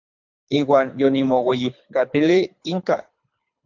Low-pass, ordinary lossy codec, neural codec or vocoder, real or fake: 7.2 kHz; MP3, 64 kbps; codec, 24 kHz, 3 kbps, HILCodec; fake